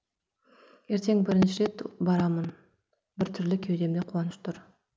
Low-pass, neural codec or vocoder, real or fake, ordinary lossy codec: none; none; real; none